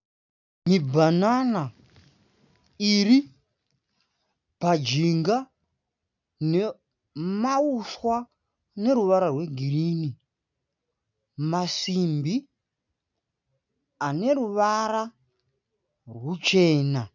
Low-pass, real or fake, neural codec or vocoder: 7.2 kHz; real; none